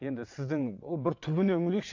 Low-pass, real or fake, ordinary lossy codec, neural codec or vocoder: 7.2 kHz; fake; AAC, 48 kbps; vocoder, 22.05 kHz, 80 mel bands, WaveNeXt